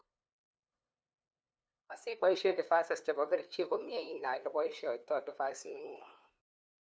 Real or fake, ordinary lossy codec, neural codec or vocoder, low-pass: fake; none; codec, 16 kHz, 2 kbps, FunCodec, trained on LibriTTS, 25 frames a second; none